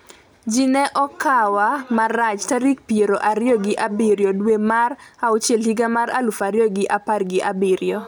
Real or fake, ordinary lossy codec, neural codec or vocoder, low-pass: real; none; none; none